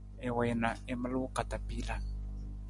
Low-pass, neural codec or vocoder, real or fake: 10.8 kHz; none; real